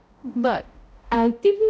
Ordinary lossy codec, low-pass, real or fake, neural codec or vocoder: none; none; fake; codec, 16 kHz, 0.5 kbps, X-Codec, HuBERT features, trained on balanced general audio